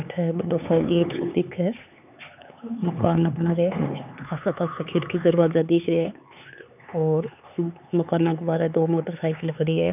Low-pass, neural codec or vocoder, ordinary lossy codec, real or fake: 3.6 kHz; codec, 16 kHz, 4 kbps, X-Codec, HuBERT features, trained on LibriSpeech; none; fake